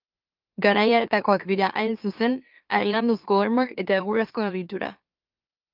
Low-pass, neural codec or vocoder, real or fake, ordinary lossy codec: 5.4 kHz; autoencoder, 44.1 kHz, a latent of 192 numbers a frame, MeloTTS; fake; Opus, 24 kbps